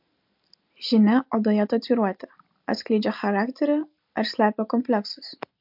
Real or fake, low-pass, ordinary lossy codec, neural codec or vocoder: real; 5.4 kHz; MP3, 48 kbps; none